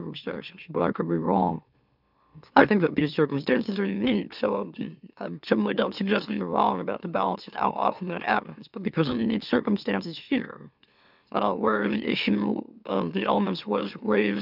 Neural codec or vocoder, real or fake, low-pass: autoencoder, 44.1 kHz, a latent of 192 numbers a frame, MeloTTS; fake; 5.4 kHz